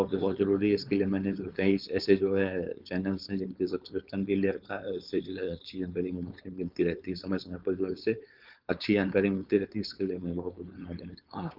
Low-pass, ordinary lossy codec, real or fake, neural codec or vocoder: 5.4 kHz; Opus, 24 kbps; fake; codec, 16 kHz, 4.8 kbps, FACodec